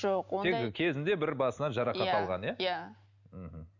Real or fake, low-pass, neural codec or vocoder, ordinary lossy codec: real; 7.2 kHz; none; none